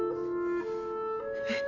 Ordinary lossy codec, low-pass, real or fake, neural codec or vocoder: none; 7.2 kHz; real; none